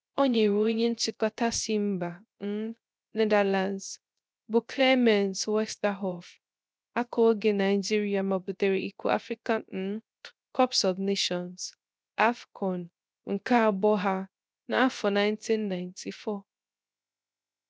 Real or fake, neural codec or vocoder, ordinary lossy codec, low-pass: fake; codec, 16 kHz, 0.3 kbps, FocalCodec; none; none